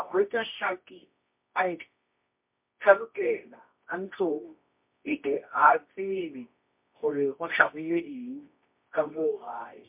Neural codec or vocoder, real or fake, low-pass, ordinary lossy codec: codec, 24 kHz, 0.9 kbps, WavTokenizer, medium music audio release; fake; 3.6 kHz; MP3, 32 kbps